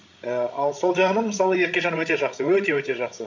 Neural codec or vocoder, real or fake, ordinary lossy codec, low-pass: codec, 16 kHz, 16 kbps, FreqCodec, larger model; fake; MP3, 64 kbps; 7.2 kHz